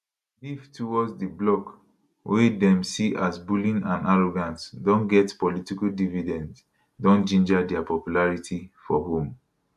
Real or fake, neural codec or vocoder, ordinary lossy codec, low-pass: real; none; none; 14.4 kHz